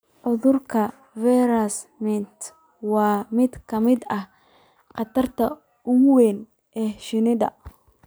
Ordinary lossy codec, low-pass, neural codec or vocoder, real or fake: none; none; none; real